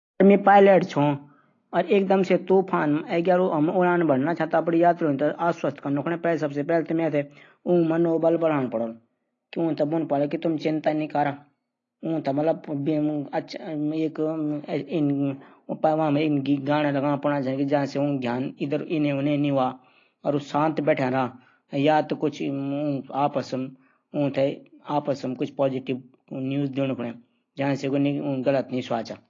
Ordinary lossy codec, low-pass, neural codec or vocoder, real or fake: AAC, 32 kbps; 7.2 kHz; none; real